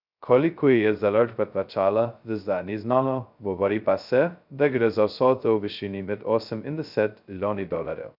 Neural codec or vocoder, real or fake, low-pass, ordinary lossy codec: codec, 16 kHz, 0.2 kbps, FocalCodec; fake; 5.4 kHz; none